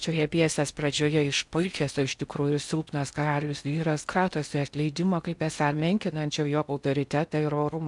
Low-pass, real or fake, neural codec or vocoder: 10.8 kHz; fake; codec, 16 kHz in and 24 kHz out, 0.6 kbps, FocalCodec, streaming, 4096 codes